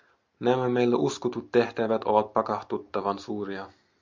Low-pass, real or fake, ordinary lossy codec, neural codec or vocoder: 7.2 kHz; real; MP3, 64 kbps; none